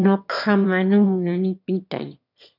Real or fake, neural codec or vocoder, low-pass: fake; autoencoder, 22.05 kHz, a latent of 192 numbers a frame, VITS, trained on one speaker; 5.4 kHz